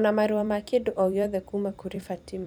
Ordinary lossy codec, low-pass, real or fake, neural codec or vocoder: none; none; fake; vocoder, 44.1 kHz, 128 mel bands every 256 samples, BigVGAN v2